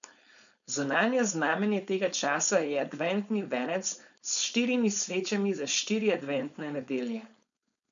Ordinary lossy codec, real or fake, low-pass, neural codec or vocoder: none; fake; 7.2 kHz; codec, 16 kHz, 4.8 kbps, FACodec